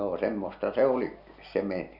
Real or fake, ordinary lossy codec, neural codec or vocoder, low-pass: real; none; none; 5.4 kHz